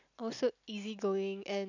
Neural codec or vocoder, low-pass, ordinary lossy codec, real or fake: none; 7.2 kHz; none; real